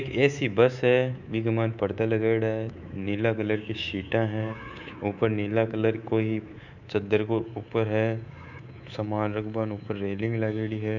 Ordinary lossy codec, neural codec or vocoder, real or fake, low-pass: none; codec, 24 kHz, 3.1 kbps, DualCodec; fake; 7.2 kHz